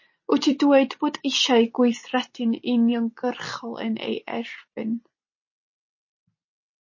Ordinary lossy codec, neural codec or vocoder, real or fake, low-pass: MP3, 32 kbps; none; real; 7.2 kHz